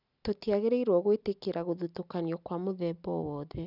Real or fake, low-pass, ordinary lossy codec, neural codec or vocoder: real; 5.4 kHz; none; none